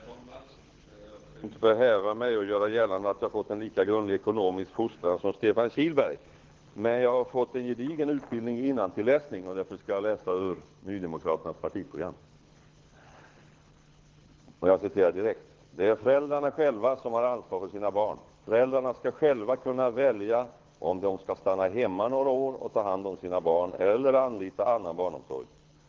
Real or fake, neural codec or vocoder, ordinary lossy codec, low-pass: fake; codec, 24 kHz, 6 kbps, HILCodec; Opus, 16 kbps; 7.2 kHz